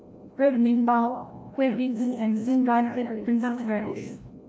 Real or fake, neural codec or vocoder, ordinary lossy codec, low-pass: fake; codec, 16 kHz, 0.5 kbps, FreqCodec, larger model; none; none